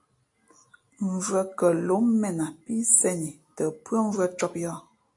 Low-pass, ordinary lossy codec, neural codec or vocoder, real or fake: 10.8 kHz; AAC, 64 kbps; none; real